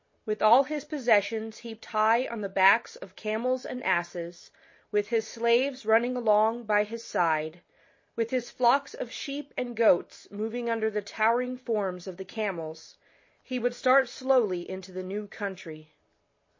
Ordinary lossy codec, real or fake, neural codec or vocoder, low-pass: MP3, 32 kbps; real; none; 7.2 kHz